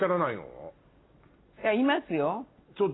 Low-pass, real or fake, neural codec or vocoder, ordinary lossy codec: 7.2 kHz; real; none; AAC, 16 kbps